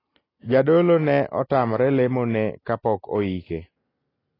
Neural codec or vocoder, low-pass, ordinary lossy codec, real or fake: none; 5.4 kHz; AAC, 24 kbps; real